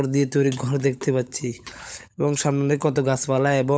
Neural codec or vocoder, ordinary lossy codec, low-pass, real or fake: codec, 16 kHz, 16 kbps, FunCodec, trained on LibriTTS, 50 frames a second; none; none; fake